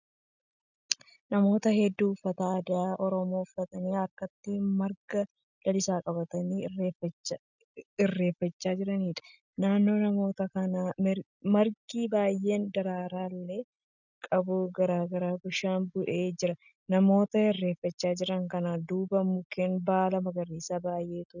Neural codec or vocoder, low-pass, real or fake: none; 7.2 kHz; real